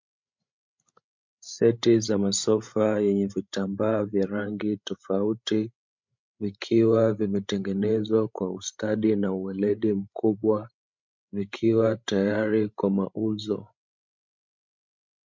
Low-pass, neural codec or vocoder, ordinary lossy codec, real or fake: 7.2 kHz; codec, 16 kHz, 16 kbps, FreqCodec, larger model; MP3, 64 kbps; fake